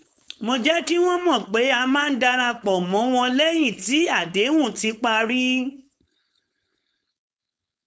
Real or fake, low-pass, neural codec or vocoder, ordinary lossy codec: fake; none; codec, 16 kHz, 4.8 kbps, FACodec; none